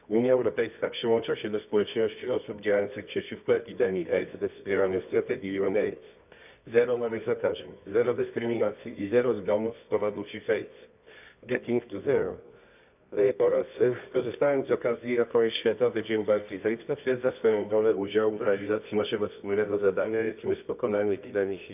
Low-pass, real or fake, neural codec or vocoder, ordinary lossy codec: 3.6 kHz; fake; codec, 24 kHz, 0.9 kbps, WavTokenizer, medium music audio release; none